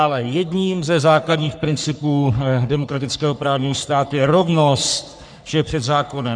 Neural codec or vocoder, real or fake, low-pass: codec, 44.1 kHz, 3.4 kbps, Pupu-Codec; fake; 9.9 kHz